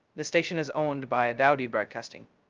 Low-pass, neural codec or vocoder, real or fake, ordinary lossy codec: 7.2 kHz; codec, 16 kHz, 0.2 kbps, FocalCodec; fake; Opus, 24 kbps